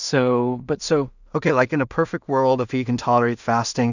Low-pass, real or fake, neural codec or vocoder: 7.2 kHz; fake; codec, 16 kHz in and 24 kHz out, 0.4 kbps, LongCat-Audio-Codec, two codebook decoder